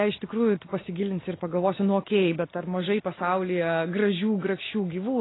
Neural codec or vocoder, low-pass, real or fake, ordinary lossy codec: none; 7.2 kHz; real; AAC, 16 kbps